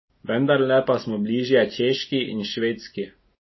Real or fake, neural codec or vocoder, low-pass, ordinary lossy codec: real; none; 7.2 kHz; MP3, 24 kbps